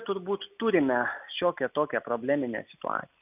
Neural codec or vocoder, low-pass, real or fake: none; 3.6 kHz; real